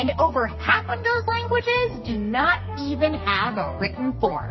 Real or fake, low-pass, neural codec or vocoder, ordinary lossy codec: fake; 7.2 kHz; codec, 32 kHz, 1.9 kbps, SNAC; MP3, 24 kbps